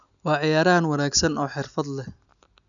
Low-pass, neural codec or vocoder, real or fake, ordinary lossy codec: 7.2 kHz; none; real; none